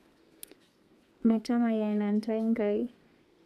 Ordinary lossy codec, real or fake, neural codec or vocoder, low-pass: none; fake; codec, 32 kHz, 1.9 kbps, SNAC; 14.4 kHz